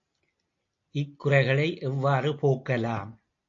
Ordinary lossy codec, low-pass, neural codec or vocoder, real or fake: AAC, 48 kbps; 7.2 kHz; none; real